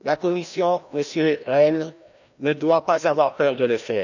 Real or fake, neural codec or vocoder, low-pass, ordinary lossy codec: fake; codec, 16 kHz, 1 kbps, FreqCodec, larger model; 7.2 kHz; none